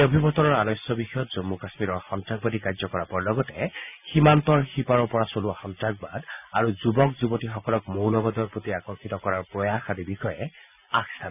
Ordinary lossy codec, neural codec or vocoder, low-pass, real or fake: none; none; 3.6 kHz; real